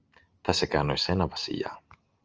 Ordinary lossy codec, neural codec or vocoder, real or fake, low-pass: Opus, 24 kbps; none; real; 7.2 kHz